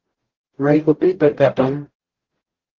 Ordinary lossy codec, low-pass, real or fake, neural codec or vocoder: Opus, 16 kbps; 7.2 kHz; fake; codec, 44.1 kHz, 0.9 kbps, DAC